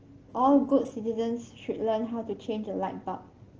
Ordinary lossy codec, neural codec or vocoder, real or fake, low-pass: Opus, 16 kbps; none; real; 7.2 kHz